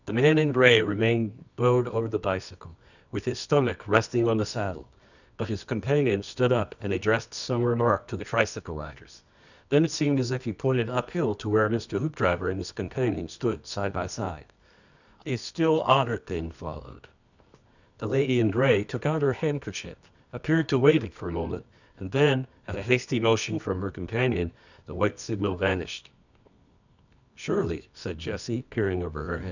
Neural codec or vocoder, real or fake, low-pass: codec, 24 kHz, 0.9 kbps, WavTokenizer, medium music audio release; fake; 7.2 kHz